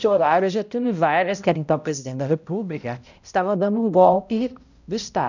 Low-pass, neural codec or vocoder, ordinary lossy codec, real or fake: 7.2 kHz; codec, 16 kHz, 0.5 kbps, X-Codec, HuBERT features, trained on balanced general audio; none; fake